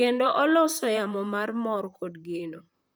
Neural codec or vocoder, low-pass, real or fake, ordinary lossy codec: vocoder, 44.1 kHz, 128 mel bands, Pupu-Vocoder; none; fake; none